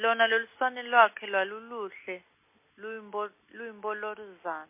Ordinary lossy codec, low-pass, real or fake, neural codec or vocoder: MP3, 24 kbps; 3.6 kHz; real; none